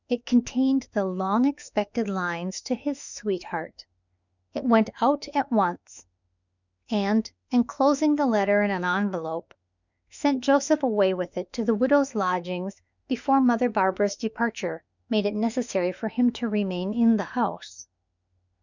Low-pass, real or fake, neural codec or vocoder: 7.2 kHz; fake; autoencoder, 48 kHz, 32 numbers a frame, DAC-VAE, trained on Japanese speech